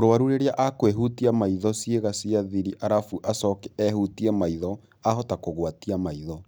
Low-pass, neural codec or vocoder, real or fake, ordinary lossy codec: none; none; real; none